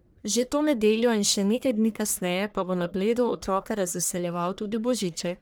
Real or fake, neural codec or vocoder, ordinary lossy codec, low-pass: fake; codec, 44.1 kHz, 1.7 kbps, Pupu-Codec; none; none